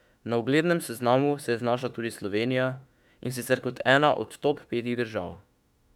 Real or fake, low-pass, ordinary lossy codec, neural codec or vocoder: fake; 19.8 kHz; none; autoencoder, 48 kHz, 32 numbers a frame, DAC-VAE, trained on Japanese speech